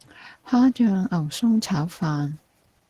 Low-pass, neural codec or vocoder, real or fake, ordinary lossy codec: 14.4 kHz; none; real; Opus, 16 kbps